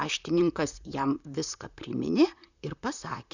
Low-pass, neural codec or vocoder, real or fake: 7.2 kHz; vocoder, 44.1 kHz, 128 mel bands, Pupu-Vocoder; fake